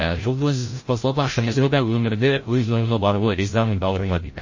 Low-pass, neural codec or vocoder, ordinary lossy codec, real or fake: 7.2 kHz; codec, 16 kHz, 0.5 kbps, FreqCodec, larger model; MP3, 32 kbps; fake